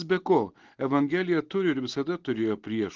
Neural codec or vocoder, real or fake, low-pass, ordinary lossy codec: none; real; 7.2 kHz; Opus, 16 kbps